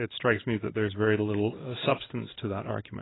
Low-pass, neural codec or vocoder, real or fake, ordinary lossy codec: 7.2 kHz; codec, 16 kHz in and 24 kHz out, 1 kbps, XY-Tokenizer; fake; AAC, 16 kbps